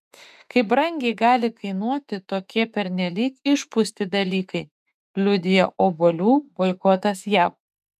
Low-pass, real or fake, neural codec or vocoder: 14.4 kHz; fake; autoencoder, 48 kHz, 32 numbers a frame, DAC-VAE, trained on Japanese speech